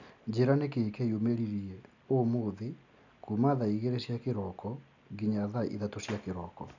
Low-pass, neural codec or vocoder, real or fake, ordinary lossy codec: 7.2 kHz; none; real; none